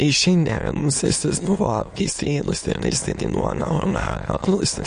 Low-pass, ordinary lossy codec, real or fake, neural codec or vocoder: 9.9 kHz; MP3, 48 kbps; fake; autoencoder, 22.05 kHz, a latent of 192 numbers a frame, VITS, trained on many speakers